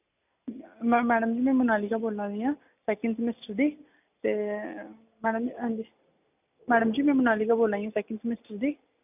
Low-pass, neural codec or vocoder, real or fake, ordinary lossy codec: 3.6 kHz; none; real; none